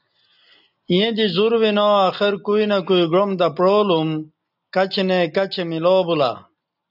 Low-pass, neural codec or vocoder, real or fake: 5.4 kHz; none; real